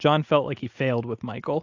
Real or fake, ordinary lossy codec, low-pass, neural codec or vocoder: real; AAC, 48 kbps; 7.2 kHz; none